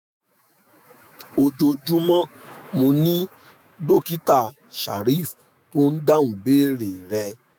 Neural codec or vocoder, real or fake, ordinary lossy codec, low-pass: autoencoder, 48 kHz, 128 numbers a frame, DAC-VAE, trained on Japanese speech; fake; none; none